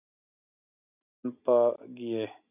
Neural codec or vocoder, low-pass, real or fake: none; 3.6 kHz; real